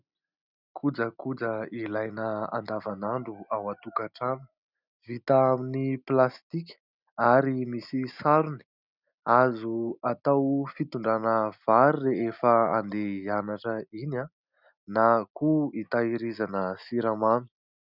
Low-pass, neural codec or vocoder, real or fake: 5.4 kHz; none; real